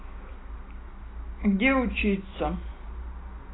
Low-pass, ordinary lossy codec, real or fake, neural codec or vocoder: 7.2 kHz; AAC, 16 kbps; real; none